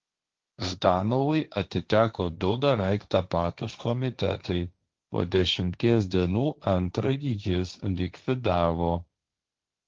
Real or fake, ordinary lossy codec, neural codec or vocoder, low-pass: fake; Opus, 32 kbps; codec, 16 kHz, 1.1 kbps, Voila-Tokenizer; 7.2 kHz